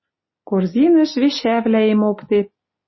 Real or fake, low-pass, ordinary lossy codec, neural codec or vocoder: real; 7.2 kHz; MP3, 24 kbps; none